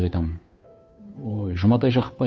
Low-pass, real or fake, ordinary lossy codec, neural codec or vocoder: none; fake; none; codec, 16 kHz, 2 kbps, FunCodec, trained on Chinese and English, 25 frames a second